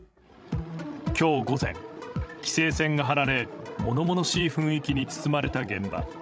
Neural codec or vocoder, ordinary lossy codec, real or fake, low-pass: codec, 16 kHz, 16 kbps, FreqCodec, larger model; none; fake; none